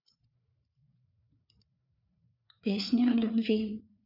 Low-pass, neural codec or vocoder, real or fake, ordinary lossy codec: 5.4 kHz; codec, 16 kHz, 4 kbps, FreqCodec, larger model; fake; none